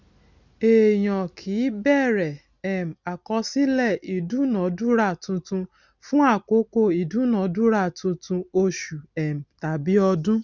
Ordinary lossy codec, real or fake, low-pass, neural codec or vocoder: none; real; 7.2 kHz; none